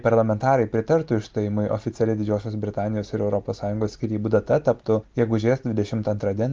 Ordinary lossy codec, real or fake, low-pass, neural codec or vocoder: Opus, 32 kbps; real; 7.2 kHz; none